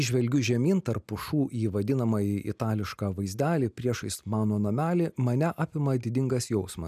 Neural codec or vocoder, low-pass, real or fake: none; 14.4 kHz; real